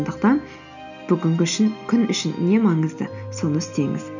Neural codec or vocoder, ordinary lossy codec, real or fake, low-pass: none; none; real; 7.2 kHz